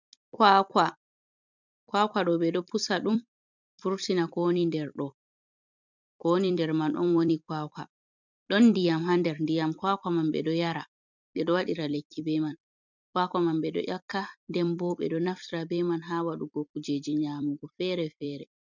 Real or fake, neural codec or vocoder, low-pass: fake; vocoder, 44.1 kHz, 128 mel bands every 256 samples, BigVGAN v2; 7.2 kHz